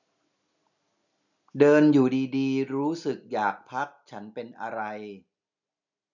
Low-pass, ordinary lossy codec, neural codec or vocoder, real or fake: 7.2 kHz; none; none; real